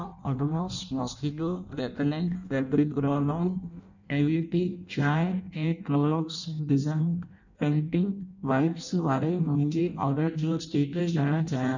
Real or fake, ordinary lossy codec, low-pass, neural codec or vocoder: fake; none; 7.2 kHz; codec, 16 kHz in and 24 kHz out, 0.6 kbps, FireRedTTS-2 codec